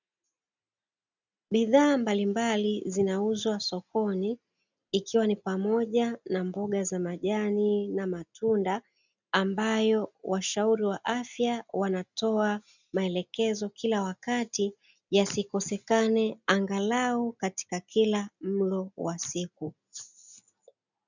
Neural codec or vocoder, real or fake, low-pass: none; real; 7.2 kHz